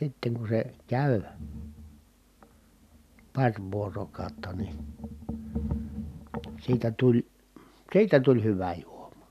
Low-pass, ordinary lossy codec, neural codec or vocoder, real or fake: 14.4 kHz; none; none; real